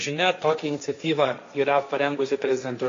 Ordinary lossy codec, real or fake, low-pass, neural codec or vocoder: AAC, 48 kbps; fake; 7.2 kHz; codec, 16 kHz, 1.1 kbps, Voila-Tokenizer